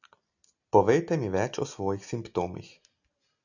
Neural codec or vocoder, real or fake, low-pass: none; real; 7.2 kHz